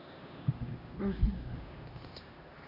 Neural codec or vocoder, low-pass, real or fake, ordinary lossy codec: codec, 16 kHz, 1 kbps, X-Codec, HuBERT features, trained on LibriSpeech; 5.4 kHz; fake; Opus, 64 kbps